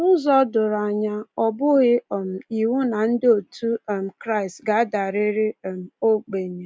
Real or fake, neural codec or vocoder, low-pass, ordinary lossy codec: real; none; none; none